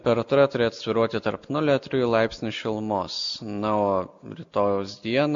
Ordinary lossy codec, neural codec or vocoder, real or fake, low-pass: MP3, 48 kbps; none; real; 7.2 kHz